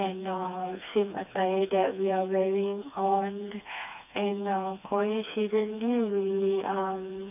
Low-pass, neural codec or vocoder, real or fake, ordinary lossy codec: 3.6 kHz; codec, 16 kHz, 2 kbps, FreqCodec, smaller model; fake; none